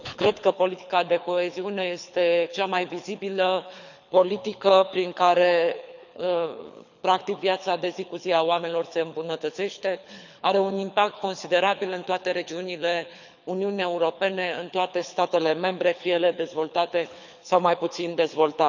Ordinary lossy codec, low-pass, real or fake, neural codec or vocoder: none; 7.2 kHz; fake; codec, 24 kHz, 6 kbps, HILCodec